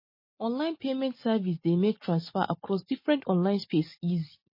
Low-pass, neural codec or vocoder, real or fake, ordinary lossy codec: 5.4 kHz; none; real; MP3, 24 kbps